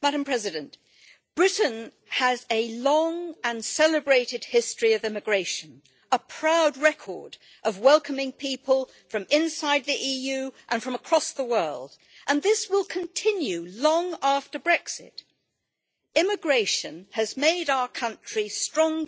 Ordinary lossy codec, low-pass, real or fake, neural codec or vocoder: none; none; real; none